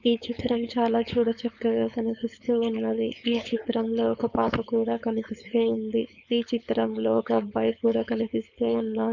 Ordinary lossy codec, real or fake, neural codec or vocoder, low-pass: none; fake; codec, 16 kHz, 4.8 kbps, FACodec; 7.2 kHz